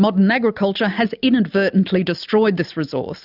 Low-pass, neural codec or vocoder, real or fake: 5.4 kHz; none; real